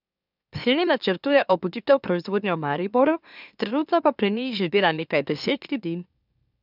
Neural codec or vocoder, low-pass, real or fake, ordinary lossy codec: autoencoder, 44.1 kHz, a latent of 192 numbers a frame, MeloTTS; 5.4 kHz; fake; none